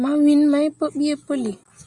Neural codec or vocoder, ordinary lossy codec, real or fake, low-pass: none; Opus, 64 kbps; real; 10.8 kHz